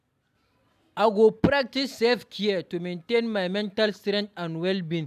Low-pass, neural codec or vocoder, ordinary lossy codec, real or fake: 14.4 kHz; none; none; real